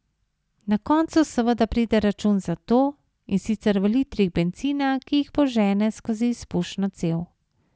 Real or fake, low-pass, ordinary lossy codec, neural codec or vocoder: real; none; none; none